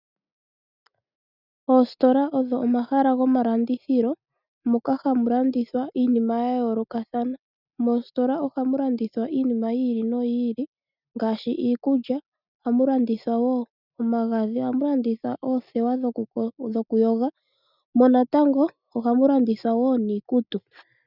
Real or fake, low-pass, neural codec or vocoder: real; 5.4 kHz; none